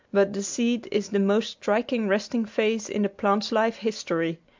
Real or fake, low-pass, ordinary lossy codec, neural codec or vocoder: real; 7.2 kHz; MP3, 64 kbps; none